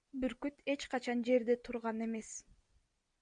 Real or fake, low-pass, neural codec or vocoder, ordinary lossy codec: real; 9.9 kHz; none; MP3, 64 kbps